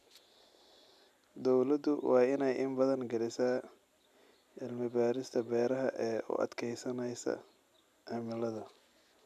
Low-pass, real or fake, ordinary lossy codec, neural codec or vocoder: 14.4 kHz; real; none; none